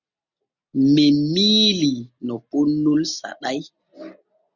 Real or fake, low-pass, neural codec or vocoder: real; 7.2 kHz; none